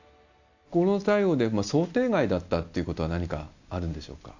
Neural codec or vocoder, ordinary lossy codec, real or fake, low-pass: none; none; real; 7.2 kHz